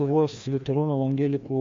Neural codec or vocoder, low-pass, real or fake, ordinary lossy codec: codec, 16 kHz, 1 kbps, FreqCodec, larger model; 7.2 kHz; fake; MP3, 48 kbps